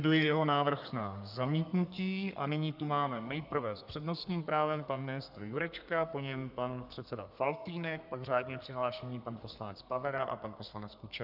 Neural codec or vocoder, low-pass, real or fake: codec, 32 kHz, 1.9 kbps, SNAC; 5.4 kHz; fake